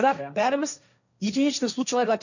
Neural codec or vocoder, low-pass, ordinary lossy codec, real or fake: codec, 16 kHz, 1.1 kbps, Voila-Tokenizer; 7.2 kHz; none; fake